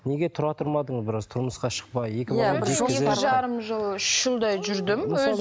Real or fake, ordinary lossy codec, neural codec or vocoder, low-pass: real; none; none; none